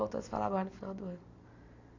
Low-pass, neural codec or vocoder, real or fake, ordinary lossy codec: 7.2 kHz; none; real; none